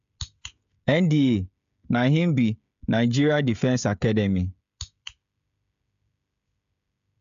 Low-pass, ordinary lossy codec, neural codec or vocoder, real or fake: 7.2 kHz; AAC, 96 kbps; codec, 16 kHz, 16 kbps, FreqCodec, smaller model; fake